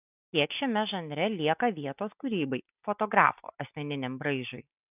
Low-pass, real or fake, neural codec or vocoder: 3.6 kHz; real; none